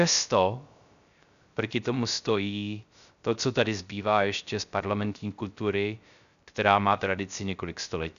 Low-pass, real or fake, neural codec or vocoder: 7.2 kHz; fake; codec, 16 kHz, 0.3 kbps, FocalCodec